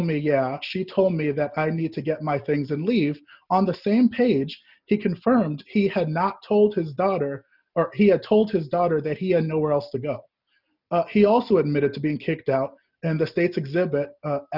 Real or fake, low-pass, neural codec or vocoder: real; 5.4 kHz; none